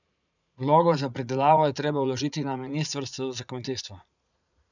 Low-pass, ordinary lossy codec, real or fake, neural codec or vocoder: 7.2 kHz; none; fake; vocoder, 44.1 kHz, 80 mel bands, Vocos